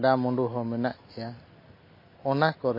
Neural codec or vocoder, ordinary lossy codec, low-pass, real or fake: none; MP3, 24 kbps; 5.4 kHz; real